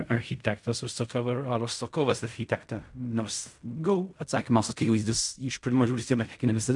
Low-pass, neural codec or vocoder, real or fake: 10.8 kHz; codec, 16 kHz in and 24 kHz out, 0.4 kbps, LongCat-Audio-Codec, fine tuned four codebook decoder; fake